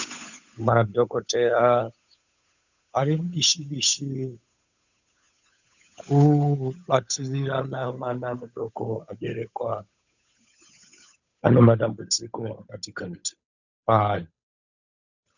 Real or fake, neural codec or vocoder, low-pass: fake; codec, 16 kHz, 8 kbps, FunCodec, trained on Chinese and English, 25 frames a second; 7.2 kHz